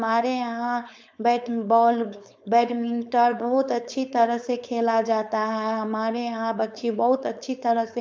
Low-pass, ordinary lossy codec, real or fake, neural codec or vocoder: none; none; fake; codec, 16 kHz, 4.8 kbps, FACodec